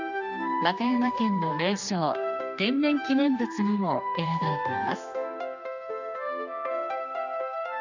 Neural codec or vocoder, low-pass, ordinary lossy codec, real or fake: codec, 16 kHz, 2 kbps, X-Codec, HuBERT features, trained on general audio; 7.2 kHz; none; fake